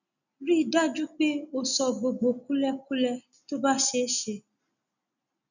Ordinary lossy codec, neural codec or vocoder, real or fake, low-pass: none; none; real; 7.2 kHz